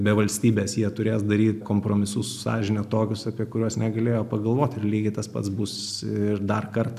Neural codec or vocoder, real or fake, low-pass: none; real; 14.4 kHz